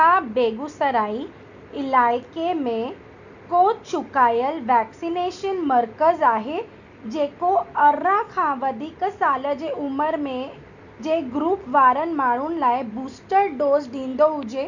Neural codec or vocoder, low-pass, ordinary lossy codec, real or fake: none; 7.2 kHz; none; real